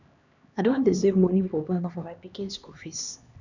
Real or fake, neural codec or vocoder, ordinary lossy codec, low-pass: fake; codec, 16 kHz, 2 kbps, X-Codec, HuBERT features, trained on LibriSpeech; none; 7.2 kHz